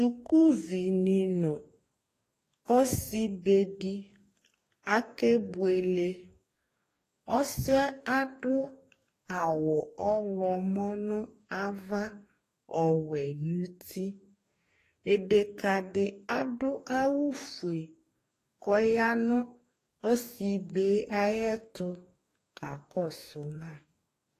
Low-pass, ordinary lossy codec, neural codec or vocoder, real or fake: 14.4 kHz; AAC, 48 kbps; codec, 44.1 kHz, 2.6 kbps, DAC; fake